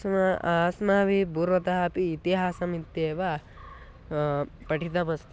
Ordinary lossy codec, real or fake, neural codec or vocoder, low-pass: none; real; none; none